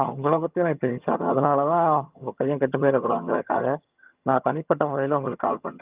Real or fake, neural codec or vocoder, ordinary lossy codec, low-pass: fake; vocoder, 22.05 kHz, 80 mel bands, HiFi-GAN; Opus, 24 kbps; 3.6 kHz